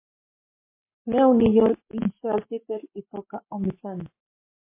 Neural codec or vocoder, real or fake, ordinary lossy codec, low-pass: codec, 44.1 kHz, 7.8 kbps, Pupu-Codec; fake; MP3, 24 kbps; 3.6 kHz